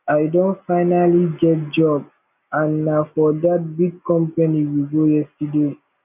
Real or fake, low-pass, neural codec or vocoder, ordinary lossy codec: real; 3.6 kHz; none; none